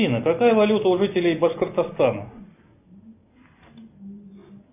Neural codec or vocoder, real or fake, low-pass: none; real; 3.6 kHz